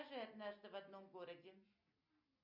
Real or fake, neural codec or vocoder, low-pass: real; none; 5.4 kHz